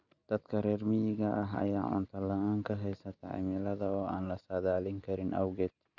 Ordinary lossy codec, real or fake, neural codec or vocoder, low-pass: none; real; none; 7.2 kHz